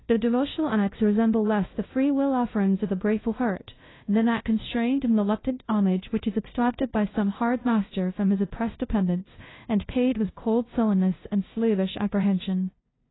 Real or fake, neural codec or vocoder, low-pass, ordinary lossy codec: fake; codec, 16 kHz, 0.5 kbps, FunCodec, trained on LibriTTS, 25 frames a second; 7.2 kHz; AAC, 16 kbps